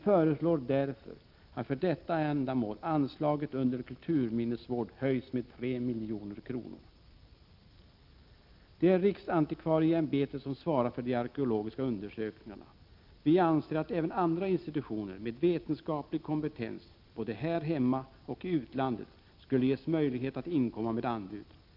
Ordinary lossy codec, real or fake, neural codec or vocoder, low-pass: Opus, 24 kbps; real; none; 5.4 kHz